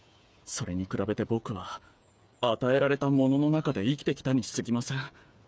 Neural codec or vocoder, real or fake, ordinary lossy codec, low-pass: codec, 16 kHz, 8 kbps, FreqCodec, smaller model; fake; none; none